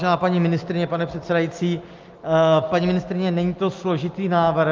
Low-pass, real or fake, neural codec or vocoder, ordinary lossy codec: 7.2 kHz; real; none; Opus, 32 kbps